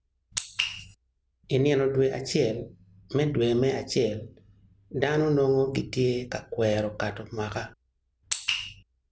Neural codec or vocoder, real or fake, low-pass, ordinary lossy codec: none; real; none; none